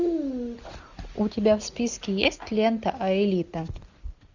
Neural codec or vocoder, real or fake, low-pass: none; real; 7.2 kHz